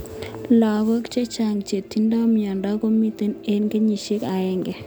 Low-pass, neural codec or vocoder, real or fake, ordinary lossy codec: none; none; real; none